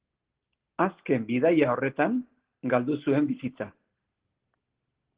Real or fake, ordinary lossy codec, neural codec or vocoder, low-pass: real; Opus, 16 kbps; none; 3.6 kHz